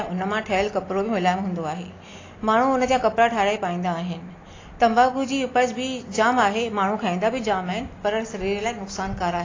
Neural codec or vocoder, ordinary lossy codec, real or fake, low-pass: none; AAC, 32 kbps; real; 7.2 kHz